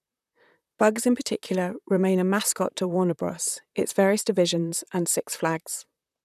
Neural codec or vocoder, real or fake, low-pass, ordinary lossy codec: vocoder, 44.1 kHz, 128 mel bands, Pupu-Vocoder; fake; 14.4 kHz; none